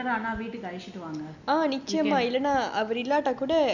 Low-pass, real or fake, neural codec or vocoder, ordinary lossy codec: 7.2 kHz; real; none; none